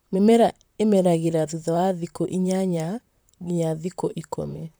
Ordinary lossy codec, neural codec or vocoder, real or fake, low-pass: none; vocoder, 44.1 kHz, 128 mel bands, Pupu-Vocoder; fake; none